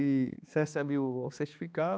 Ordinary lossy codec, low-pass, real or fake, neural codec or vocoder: none; none; fake; codec, 16 kHz, 2 kbps, X-Codec, HuBERT features, trained on balanced general audio